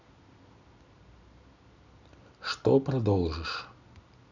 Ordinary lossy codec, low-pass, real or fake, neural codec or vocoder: none; 7.2 kHz; real; none